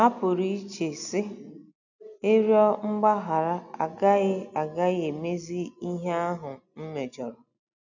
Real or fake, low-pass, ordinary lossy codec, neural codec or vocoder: real; 7.2 kHz; none; none